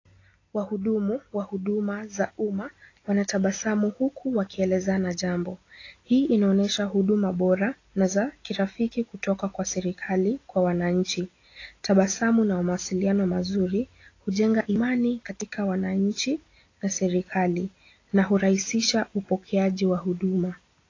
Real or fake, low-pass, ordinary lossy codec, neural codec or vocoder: real; 7.2 kHz; AAC, 32 kbps; none